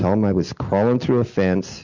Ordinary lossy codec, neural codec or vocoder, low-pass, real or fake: MP3, 64 kbps; none; 7.2 kHz; real